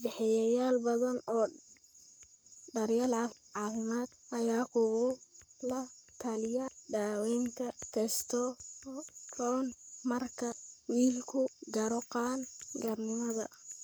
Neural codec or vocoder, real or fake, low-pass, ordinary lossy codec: codec, 44.1 kHz, 7.8 kbps, Pupu-Codec; fake; none; none